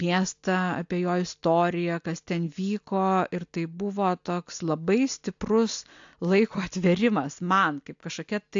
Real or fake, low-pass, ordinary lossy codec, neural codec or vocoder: real; 7.2 kHz; AAC, 64 kbps; none